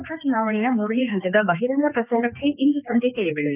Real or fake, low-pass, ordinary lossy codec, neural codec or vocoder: fake; 3.6 kHz; none; codec, 16 kHz, 2 kbps, X-Codec, HuBERT features, trained on general audio